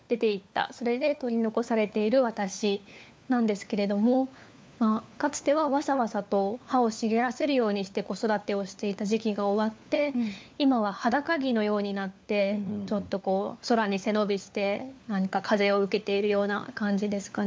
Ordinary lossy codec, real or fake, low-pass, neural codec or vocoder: none; fake; none; codec, 16 kHz, 4 kbps, FunCodec, trained on LibriTTS, 50 frames a second